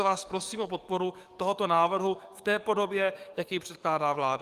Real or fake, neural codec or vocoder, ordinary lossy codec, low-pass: fake; codec, 44.1 kHz, 7.8 kbps, DAC; Opus, 32 kbps; 14.4 kHz